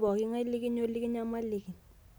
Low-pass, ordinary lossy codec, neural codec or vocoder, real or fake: none; none; none; real